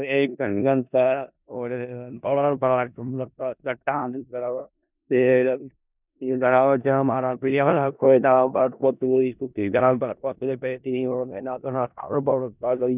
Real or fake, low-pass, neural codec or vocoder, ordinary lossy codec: fake; 3.6 kHz; codec, 16 kHz in and 24 kHz out, 0.4 kbps, LongCat-Audio-Codec, four codebook decoder; none